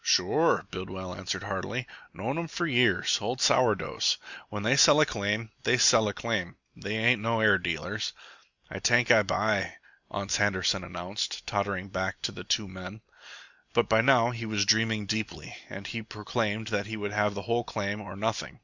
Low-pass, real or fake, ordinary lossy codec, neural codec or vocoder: 7.2 kHz; real; Opus, 64 kbps; none